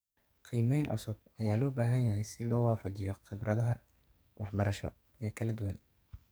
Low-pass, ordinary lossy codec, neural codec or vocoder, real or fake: none; none; codec, 44.1 kHz, 2.6 kbps, SNAC; fake